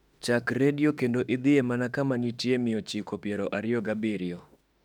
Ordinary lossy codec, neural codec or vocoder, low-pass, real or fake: none; autoencoder, 48 kHz, 32 numbers a frame, DAC-VAE, trained on Japanese speech; 19.8 kHz; fake